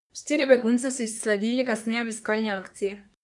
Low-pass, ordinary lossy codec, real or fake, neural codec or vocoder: 10.8 kHz; none; fake; codec, 24 kHz, 1 kbps, SNAC